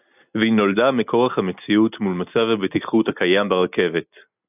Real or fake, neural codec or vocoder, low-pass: real; none; 3.6 kHz